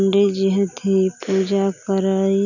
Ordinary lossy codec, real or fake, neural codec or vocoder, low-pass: none; real; none; 7.2 kHz